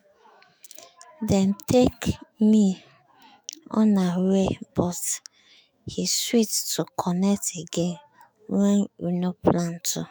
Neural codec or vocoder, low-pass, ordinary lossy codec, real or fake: autoencoder, 48 kHz, 128 numbers a frame, DAC-VAE, trained on Japanese speech; none; none; fake